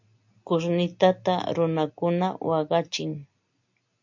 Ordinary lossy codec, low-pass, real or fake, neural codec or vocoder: MP3, 48 kbps; 7.2 kHz; real; none